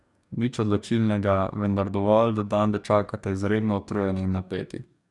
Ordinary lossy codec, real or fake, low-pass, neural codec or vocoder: none; fake; 10.8 kHz; codec, 44.1 kHz, 2.6 kbps, DAC